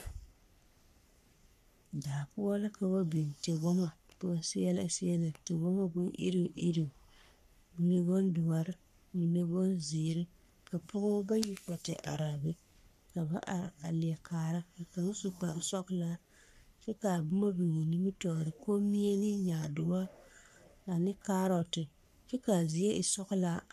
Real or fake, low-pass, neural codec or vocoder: fake; 14.4 kHz; codec, 44.1 kHz, 3.4 kbps, Pupu-Codec